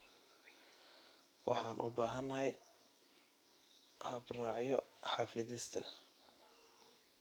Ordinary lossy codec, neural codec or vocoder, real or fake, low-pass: none; codec, 44.1 kHz, 2.6 kbps, SNAC; fake; none